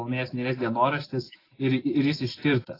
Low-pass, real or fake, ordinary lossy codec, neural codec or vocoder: 5.4 kHz; real; AAC, 24 kbps; none